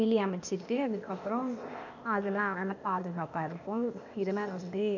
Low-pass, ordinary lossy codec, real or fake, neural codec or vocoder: 7.2 kHz; none; fake; codec, 16 kHz, 0.8 kbps, ZipCodec